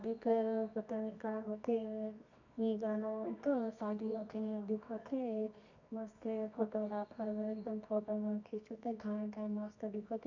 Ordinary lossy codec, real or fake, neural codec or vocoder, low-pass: none; fake; codec, 24 kHz, 0.9 kbps, WavTokenizer, medium music audio release; 7.2 kHz